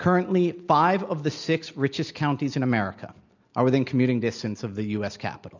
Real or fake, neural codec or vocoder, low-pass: real; none; 7.2 kHz